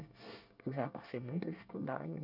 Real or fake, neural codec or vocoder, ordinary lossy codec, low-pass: fake; codec, 24 kHz, 1 kbps, SNAC; none; 5.4 kHz